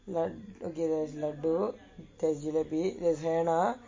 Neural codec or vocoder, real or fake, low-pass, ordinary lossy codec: none; real; 7.2 kHz; MP3, 32 kbps